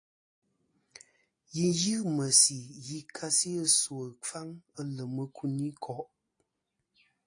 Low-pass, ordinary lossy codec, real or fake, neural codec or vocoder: 9.9 kHz; AAC, 48 kbps; real; none